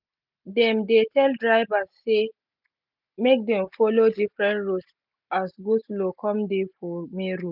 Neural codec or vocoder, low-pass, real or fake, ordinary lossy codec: none; 5.4 kHz; real; none